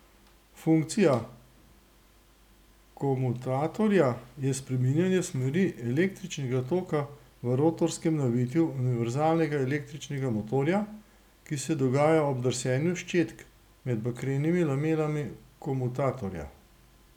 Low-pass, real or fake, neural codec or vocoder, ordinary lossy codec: 19.8 kHz; real; none; none